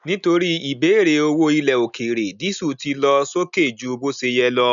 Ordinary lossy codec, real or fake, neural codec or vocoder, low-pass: none; real; none; 7.2 kHz